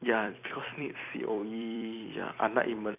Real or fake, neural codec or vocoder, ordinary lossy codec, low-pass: fake; vocoder, 44.1 kHz, 128 mel bands every 256 samples, BigVGAN v2; none; 3.6 kHz